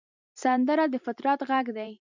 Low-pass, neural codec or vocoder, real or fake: 7.2 kHz; vocoder, 44.1 kHz, 128 mel bands every 256 samples, BigVGAN v2; fake